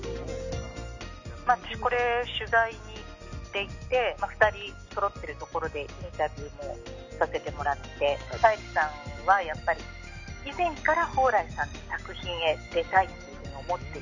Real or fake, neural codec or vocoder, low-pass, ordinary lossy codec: real; none; 7.2 kHz; none